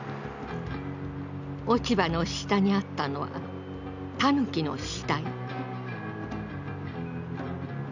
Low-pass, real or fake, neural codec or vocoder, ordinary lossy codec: 7.2 kHz; real; none; none